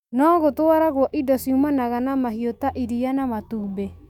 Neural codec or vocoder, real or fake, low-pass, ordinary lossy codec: autoencoder, 48 kHz, 128 numbers a frame, DAC-VAE, trained on Japanese speech; fake; 19.8 kHz; none